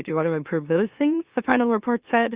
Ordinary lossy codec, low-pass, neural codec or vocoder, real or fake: Opus, 64 kbps; 3.6 kHz; autoencoder, 44.1 kHz, a latent of 192 numbers a frame, MeloTTS; fake